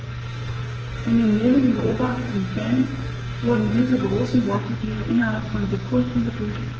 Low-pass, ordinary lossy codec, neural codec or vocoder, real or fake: 7.2 kHz; Opus, 16 kbps; codec, 44.1 kHz, 2.6 kbps, SNAC; fake